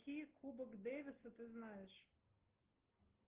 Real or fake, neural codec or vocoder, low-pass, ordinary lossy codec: real; none; 3.6 kHz; Opus, 16 kbps